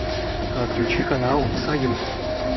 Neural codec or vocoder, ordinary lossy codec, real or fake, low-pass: codec, 16 kHz in and 24 kHz out, 1 kbps, XY-Tokenizer; MP3, 24 kbps; fake; 7.2 kHz